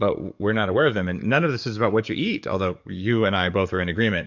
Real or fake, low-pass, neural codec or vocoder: fake; 7.2 kHz; codec, 44.1 kHz, 7.8 kbps, DAC